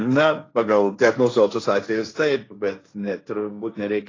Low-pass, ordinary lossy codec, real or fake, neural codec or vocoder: 7.2 kHz; AAC, 32 kbps; fake; codec, 16 kHz, 1.1 kbps, Voila-Tokenizer